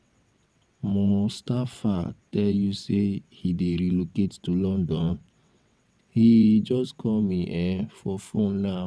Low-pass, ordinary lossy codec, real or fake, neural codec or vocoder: none; none; fake; vocoder, 22.05 kHz, 80 mel bands, WaveNeXt